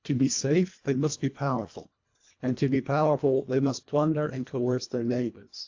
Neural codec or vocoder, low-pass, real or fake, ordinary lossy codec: codec, 24 kHz, 1.5 kbps, HILCodec; 7.2 kHz; fake; AAC, 48 kbps